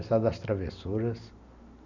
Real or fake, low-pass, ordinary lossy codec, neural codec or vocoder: real; 7.2 kHz; none; none